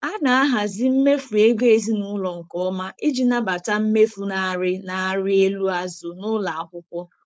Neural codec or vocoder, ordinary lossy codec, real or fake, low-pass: codec, 16 kHz, 4.8 kbps, FACodec; none; fake; none